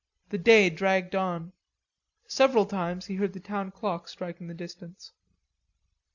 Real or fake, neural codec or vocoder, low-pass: real; none; 7.2 kHz